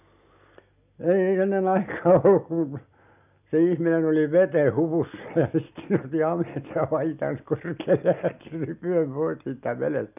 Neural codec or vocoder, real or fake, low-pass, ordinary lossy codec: none; real; 3.6 kHz; none